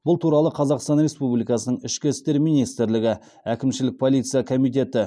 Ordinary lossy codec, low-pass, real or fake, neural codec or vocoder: none; none; real; none